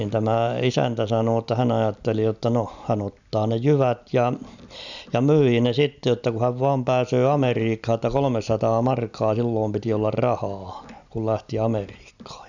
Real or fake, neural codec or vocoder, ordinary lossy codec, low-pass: real; none; none; 7.2 kHz